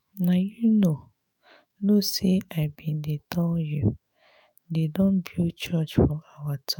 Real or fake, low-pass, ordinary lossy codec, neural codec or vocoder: fake; none; none; autoencoder, 48 kHz, 128 numbers a frame, DAC-VAE, trained on Japanese speech